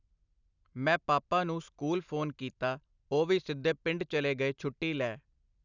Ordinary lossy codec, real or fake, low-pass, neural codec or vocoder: none; real; 7.2 kHz; none